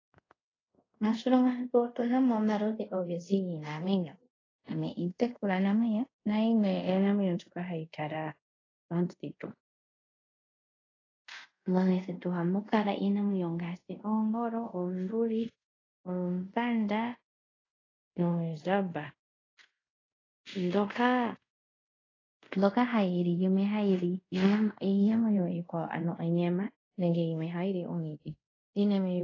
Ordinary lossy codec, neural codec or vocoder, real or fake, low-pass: AAC, 32 kbps; codec, 24 kHz, 0.5 kbps, DualCodec; fake; 7.2 kHz